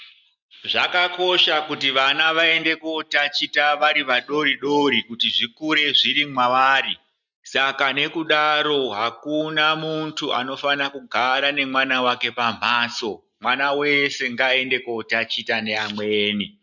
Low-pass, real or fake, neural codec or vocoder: 7.2 kHz; real; none